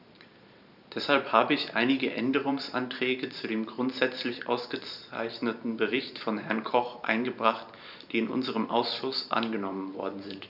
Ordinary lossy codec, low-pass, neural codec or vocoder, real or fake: none; 5.4 kHz; vocoder, 44.1 kHz, 128 mel bands every 512 samples, BigVGAN v2; fake